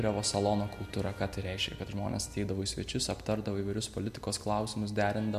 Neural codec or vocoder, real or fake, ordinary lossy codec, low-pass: none; real; MP3, 64 kbps; 14.4 kHz